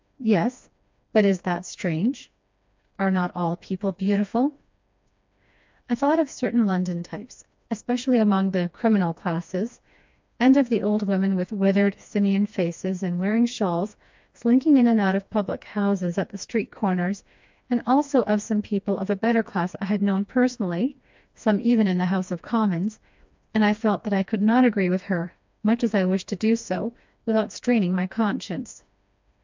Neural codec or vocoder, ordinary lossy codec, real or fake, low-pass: codec, 16 kHz, 2 kbps, FreqCodec, smaller model; MP3, 64 kbps; fake; 7.2 kHz